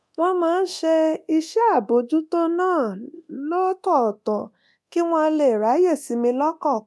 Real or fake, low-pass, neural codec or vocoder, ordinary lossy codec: fake; none; codec, 24 kHz, 0.9 kbps, DualCodec; none